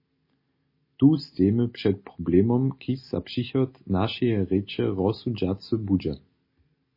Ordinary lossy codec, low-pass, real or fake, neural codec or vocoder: MP3, 24 kbps; 5.4 kHz; real; none